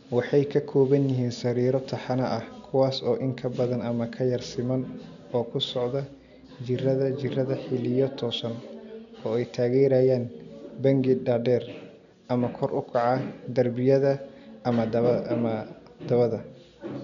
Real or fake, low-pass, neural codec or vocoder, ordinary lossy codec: real; 7.2 kHz; none; none